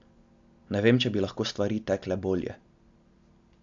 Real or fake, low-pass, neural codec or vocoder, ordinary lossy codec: real; 7.2 kHz; none; AAC, 64 kbps